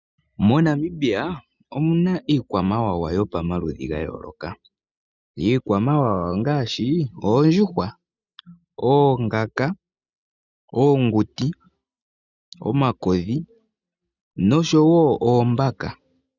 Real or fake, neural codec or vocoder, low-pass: real; none; 7.2 kHz